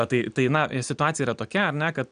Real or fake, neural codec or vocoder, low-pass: real; none; 9.9 kHz